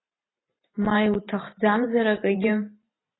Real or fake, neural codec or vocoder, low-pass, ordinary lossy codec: fake; vocoder, 44.1 kHz, 128 mel bands every 512 samples, BigVGAN v2; 7.2 kHz; AAC, 16 kbps